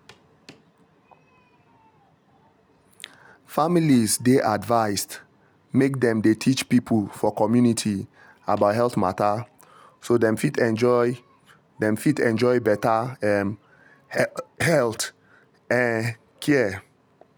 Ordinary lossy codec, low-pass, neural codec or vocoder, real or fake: none; none; none; real